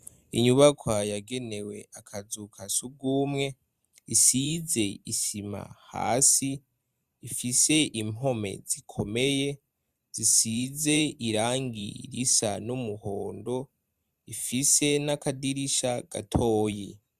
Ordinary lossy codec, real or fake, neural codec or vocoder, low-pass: Opus, 64 kbps; fake; vocoder, 44.1 kHz, 128 mel bands every 512 samples, BigVGAN v2; 14.4 kHz